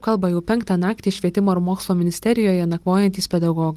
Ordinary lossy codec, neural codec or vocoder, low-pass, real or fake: Opus, 32 kbps; none; 14.4 kHz; real